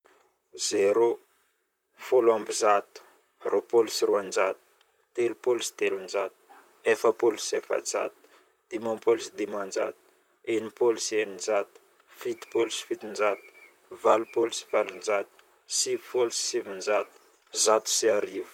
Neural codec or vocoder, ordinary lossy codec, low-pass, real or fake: vocoder, 44.1 kHz, 128 mel bands, Pupu-Vocoder; none; 19.8 kHz; fake